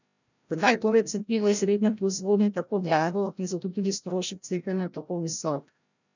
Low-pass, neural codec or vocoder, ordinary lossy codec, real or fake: 7.2 kHz; codec, 16 kHz, 0.5 kbps, FreqCodec, larger model; none; fake